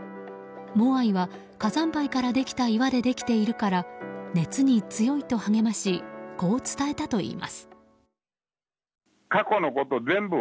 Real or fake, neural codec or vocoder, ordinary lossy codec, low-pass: real; none; none; none